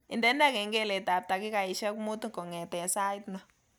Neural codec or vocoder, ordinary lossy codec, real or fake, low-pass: none; none; real; none